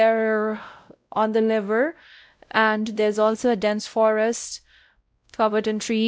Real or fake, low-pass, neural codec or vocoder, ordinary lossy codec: fake; none; codec, 16 kHz, 0.5 kbps, X-Codec, WavLM features, trained on Multilingual LibriSpeech; none